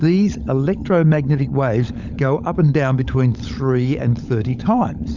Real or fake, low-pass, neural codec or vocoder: fake; 7.2 kHz; codec, 16 kHz, 16 kbps, FunCodec, trained on LibriTTS, 50 frames a second